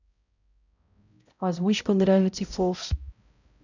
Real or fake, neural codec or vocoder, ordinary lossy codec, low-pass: fake; codec, 16 kHz, 0.5 kbps, X-Codec, HuBERT features, trained on balanced general audio; none; 7.2 kHz